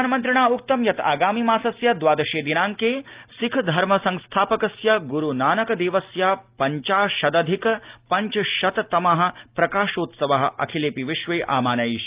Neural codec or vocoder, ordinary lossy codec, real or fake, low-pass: none; Opus, 32 kbps; real; 3.6 kHz